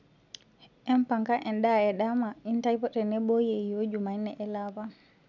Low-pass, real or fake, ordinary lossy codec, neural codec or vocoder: 7.2 kHz; real; none; none